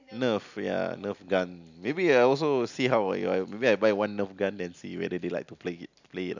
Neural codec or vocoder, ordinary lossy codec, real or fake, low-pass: none; none; real; 7.2 kHz